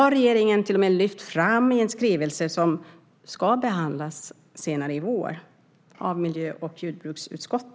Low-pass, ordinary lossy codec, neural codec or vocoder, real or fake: none; none; none; real